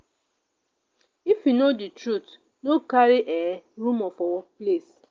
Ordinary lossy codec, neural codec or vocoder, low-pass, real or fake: Opus, 24 kbps; none; 7.2 kHz; real